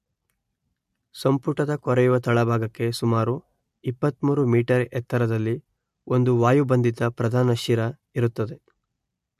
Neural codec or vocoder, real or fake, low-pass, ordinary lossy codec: none; real; 14.4 kHz; MP3, 64 kbps